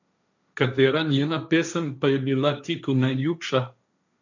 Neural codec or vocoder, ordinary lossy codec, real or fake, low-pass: codec, 16 kHz, 1.1 kbps, Voila-Tokenizer; none; fake; 7.2 kHz